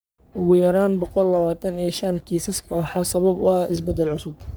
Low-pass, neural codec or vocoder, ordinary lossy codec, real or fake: none; codec, 44.1 kHz, 3.4 kbps, Pupu-Codec; none; fake